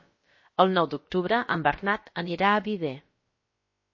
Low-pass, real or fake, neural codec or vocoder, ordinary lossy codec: 7.2 kHz; fake; codec, 16 kHz, about 1 kbps, DyCAST, with the encoder's durations; MP3, 32 kbps